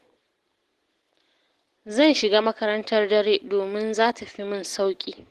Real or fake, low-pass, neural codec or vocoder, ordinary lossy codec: real; 14.4 kHz; none; Opus, 16 kbps